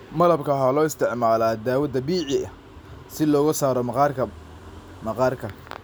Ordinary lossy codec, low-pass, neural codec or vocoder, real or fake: none; none; none; real